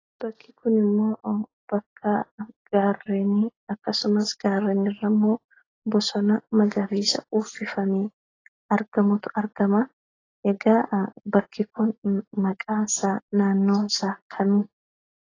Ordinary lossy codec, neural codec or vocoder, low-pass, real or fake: AAC, 32 kbps; none; 7.2 kHz; real